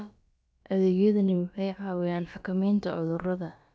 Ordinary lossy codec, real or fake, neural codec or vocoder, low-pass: none; fake; codec, 16 kHz, about 1 kbps, DyCAST, with the encoder's durations; none